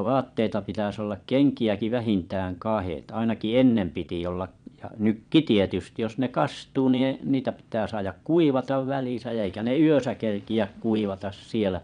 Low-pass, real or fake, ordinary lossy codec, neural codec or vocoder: 9.9 kHz; fake; none; vocoder, 22.05 kHz, 80 mel bands, Vocos